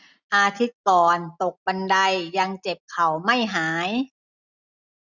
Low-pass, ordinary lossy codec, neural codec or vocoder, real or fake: 7.2 kHz; none; none; real